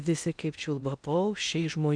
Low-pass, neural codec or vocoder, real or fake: 9.9 kHz; codec, 16 kHz in and 24 kHz out, 0.8 kbps, FocalCodec, streaming, 65536 codes; fake